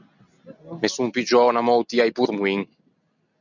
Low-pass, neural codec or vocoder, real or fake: 7.2 kHz; none; real